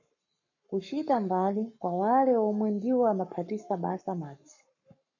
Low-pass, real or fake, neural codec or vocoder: 7.2 kHz; fake; codec, 44.1 kHz, 7.8 kbps, Pupu-Codec